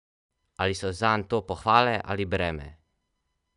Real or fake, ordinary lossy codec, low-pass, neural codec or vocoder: real; none; 10.8 kHz; none